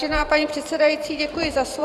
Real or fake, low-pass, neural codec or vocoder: fake; 14.4 kHz; vocoder, 44.1 kHz, 128 mel bands every 512 samples, BigVGAN v2